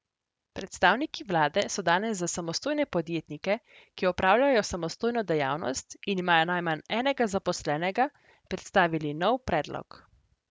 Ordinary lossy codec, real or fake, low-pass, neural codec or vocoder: none; real; none; none